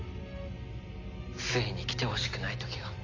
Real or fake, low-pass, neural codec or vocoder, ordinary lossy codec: real; 7.2 kHz; none; AAC, 32 kbps